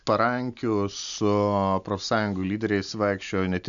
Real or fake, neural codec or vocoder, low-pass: real; none; 7.2 kHz